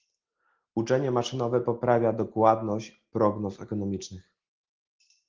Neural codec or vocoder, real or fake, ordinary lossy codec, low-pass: none; real; Opus, 16 kbps; 7.2 kHz